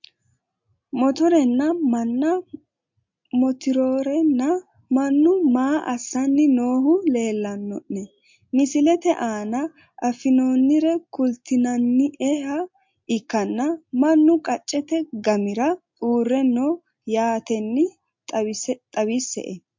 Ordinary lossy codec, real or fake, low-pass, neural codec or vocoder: MP3, 48 kbps; real; 7.2 kHz; none